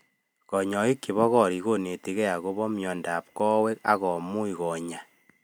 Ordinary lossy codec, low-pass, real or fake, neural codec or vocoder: none; none; real; none